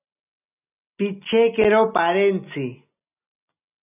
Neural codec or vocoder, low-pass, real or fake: none; 3.6 kHz; real